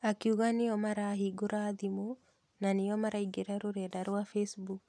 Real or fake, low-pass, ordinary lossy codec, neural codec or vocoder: real; none; none; none